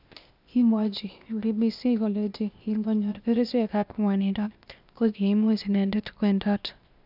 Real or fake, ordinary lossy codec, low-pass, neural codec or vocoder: fake; none; 5.4 kHz; codec, 16 kHz, 0.8 kbps, ZipCodec